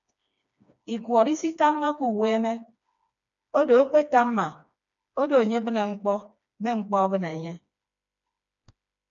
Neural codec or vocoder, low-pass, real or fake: codec, 16 kHz, 2 kbps, FreqCodec, smaller model; 7.2 kHz; fake